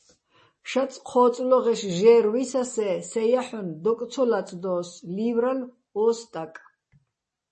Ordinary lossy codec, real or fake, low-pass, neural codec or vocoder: MP3, 32 kbps; real; 10.8 kHz; none